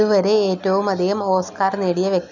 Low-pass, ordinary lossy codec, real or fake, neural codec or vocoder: 7.2 kHz; none; real; none